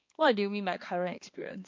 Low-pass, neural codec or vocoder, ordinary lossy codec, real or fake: 7.2 kHz; codec, 16 kHz, 2 kbps, X-Codec, HuBERT features, trained on balanced general audio; MP3, 48 kbps; fake